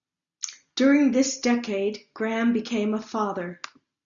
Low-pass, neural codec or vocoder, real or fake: 7.2 kHz; none; real